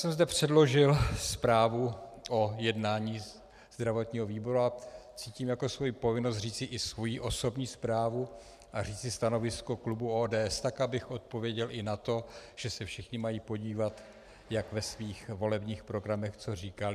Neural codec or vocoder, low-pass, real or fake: vocoder, 44.1 kHz, 128 mel bands every 512 samples, BigVGAN v2; 14.4 kHz; fake